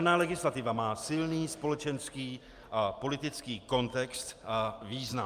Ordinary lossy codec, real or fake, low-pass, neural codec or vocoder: Opus, 32 kbps; real; 14.4 kHz; none